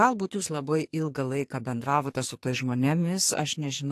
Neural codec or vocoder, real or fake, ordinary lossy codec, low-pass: codec, 44.1 kHz, 2.6 kbps, SNAC; fake; AAC, 64 kbps; 14.4 kHz